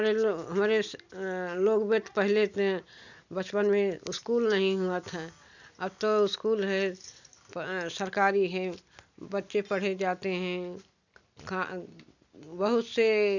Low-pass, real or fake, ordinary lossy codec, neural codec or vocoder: 7.2 kHz; real; none; none